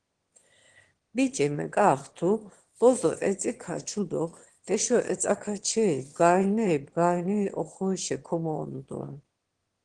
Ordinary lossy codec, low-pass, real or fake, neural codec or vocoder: Opus, 16 kbps; 9.9 kHz; fake; autoencoder, 22.05 kHz, a latent of 192 numbers a frame, VITS, trained on one speaker